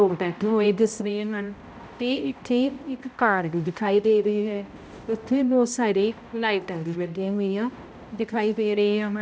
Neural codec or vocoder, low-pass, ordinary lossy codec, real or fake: codec, 16 kHz, 0.5 kbps, X-Codec, HuBERT features, trained on balanced general audio; none; none; fake